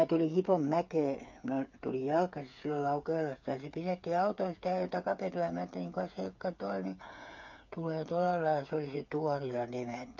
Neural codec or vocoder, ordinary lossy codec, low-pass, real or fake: codec, 16 kHz, 8 kbps, FreqCodec, smaller model; MP3, 48 kbps; 7.2 kHz; fake